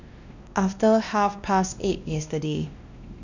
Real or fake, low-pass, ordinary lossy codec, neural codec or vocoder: fake; 7.2 kHz; none; codec, 16 kHz, 1 kbps, X-Codec, WavLM features, trained on Multilingual LibriSpeech